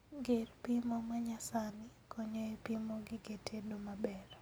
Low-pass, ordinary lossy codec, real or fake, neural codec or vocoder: none; none; real; none